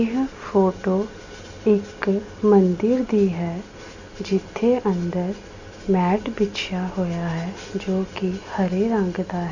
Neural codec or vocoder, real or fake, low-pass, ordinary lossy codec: none; real; 7.2 kHz; none